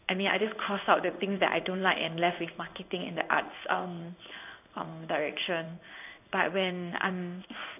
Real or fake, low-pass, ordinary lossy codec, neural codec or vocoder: fake; 3.6 kHz; none; codec, 16 kHz in and 24 kHz out, 1 kbps, XY-Tokenizer